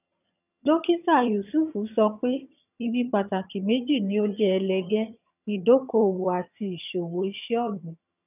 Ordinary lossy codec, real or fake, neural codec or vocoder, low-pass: none; fake; vocoder, 22.05 kHz, 80 mel bands, HiFi-GAN; 3.6 kHz